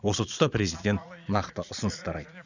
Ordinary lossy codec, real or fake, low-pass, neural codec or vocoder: none; fake; 7.2 kHz; vocoder, 22.05 kHz, 80 mel bands, Vocos